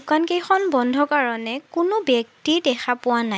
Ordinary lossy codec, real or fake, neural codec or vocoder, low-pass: none; real; none; none